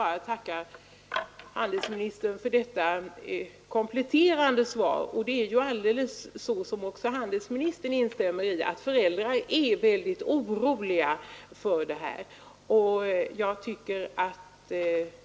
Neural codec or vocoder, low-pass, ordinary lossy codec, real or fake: none; none; none; real